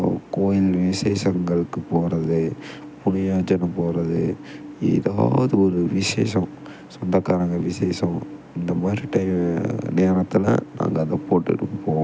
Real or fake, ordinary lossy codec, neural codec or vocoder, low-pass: real; none; none; none